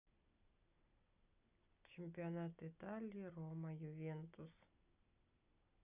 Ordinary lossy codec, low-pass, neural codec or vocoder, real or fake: none; 3.6 kHz; none; real